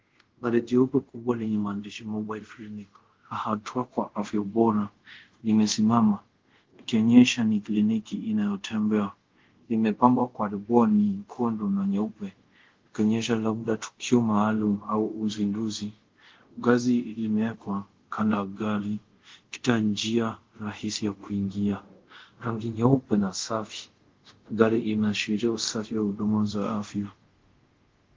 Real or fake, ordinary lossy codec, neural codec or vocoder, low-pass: fake; Opus, 16 kbps; codec, 24 kHz, 0.5 kbps, DualCodec; 7.2 kHz